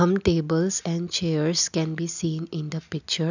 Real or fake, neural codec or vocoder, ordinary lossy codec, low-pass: real; none; none; 7.2 kHz